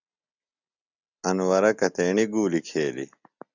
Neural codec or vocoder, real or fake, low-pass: none; real; 7.2 kHz